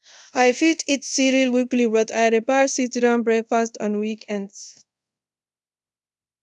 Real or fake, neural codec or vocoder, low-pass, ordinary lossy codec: fake; codec, 24 kHz, 0.5 kbps, DualCodec; none; none